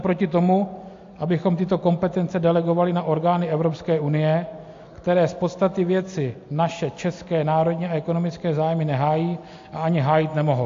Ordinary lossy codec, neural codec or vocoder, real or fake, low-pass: AAC, 48 kbps; none; real; 7.2 kHz